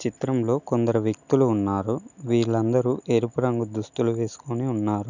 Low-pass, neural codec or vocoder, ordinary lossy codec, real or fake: 7.2 kHz; none; none; real